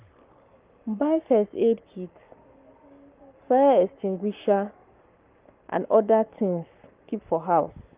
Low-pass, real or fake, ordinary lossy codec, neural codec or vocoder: 3.6 kHz; fake; Opus, 24 kbps; codec, 44.1 kHz, 7.8 kbps, Pupu-Codec